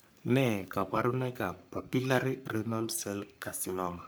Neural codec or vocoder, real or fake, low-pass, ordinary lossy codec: codec, 44.1 kHz, 3.4 kbps, Pupu-Codec; fake; none; none